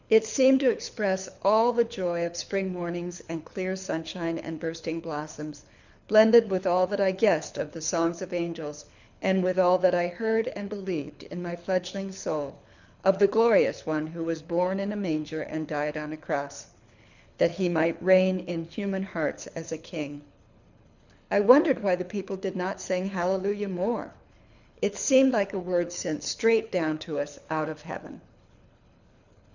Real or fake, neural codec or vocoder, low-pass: fake; codec, 24 kHz, 6 kbps, HILCodec; 7.2 kHz